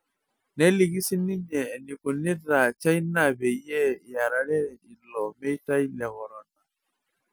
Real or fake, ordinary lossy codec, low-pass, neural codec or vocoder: real; none; none; none